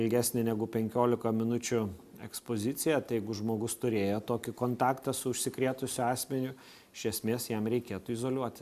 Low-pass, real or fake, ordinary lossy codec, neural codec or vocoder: 14.4 kHz; real; MP3, 96 kbps; none